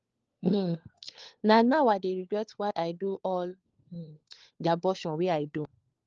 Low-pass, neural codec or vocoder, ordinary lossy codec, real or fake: 7.2 kHz; codec, 16 kHz, 4 kbps, FunCodec, trained on LibriTTS, 50 frames a second; Opus, 24 kbps; fake